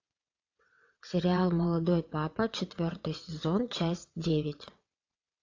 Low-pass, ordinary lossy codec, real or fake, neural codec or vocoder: 7.2 kHz; AAC, 48 kbps; fake; vocoder, 22.05 kHz, 80 mel bands, WaveNeXt